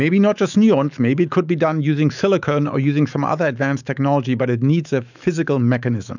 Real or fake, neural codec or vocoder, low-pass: fake; autoencoder, 48 kHz, 128 numbers a frame, DAC-VAE, trained on Japanese speech; 7.2 kHz